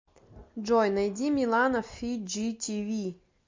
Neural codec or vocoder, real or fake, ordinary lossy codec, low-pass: none; real; MP3, 48 kbps; 7.2 kHz